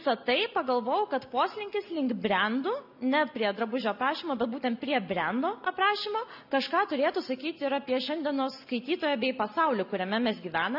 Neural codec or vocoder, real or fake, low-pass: none; real; 5.4 kHz